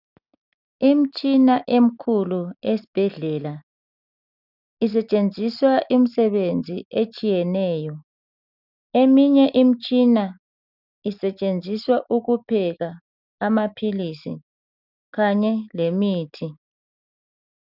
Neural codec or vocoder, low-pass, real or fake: none; 5.4 kHz; real